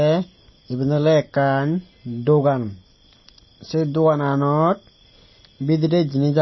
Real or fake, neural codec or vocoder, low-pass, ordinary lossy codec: real; none; 7.2 kHz; MP3, 24 kbps